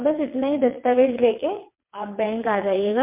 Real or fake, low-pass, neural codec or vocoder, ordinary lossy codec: fake; 3.6 kHz; vocoder, 22.05 kHz, 80 mel bands, WaveNeXt; MP3, 32 kbps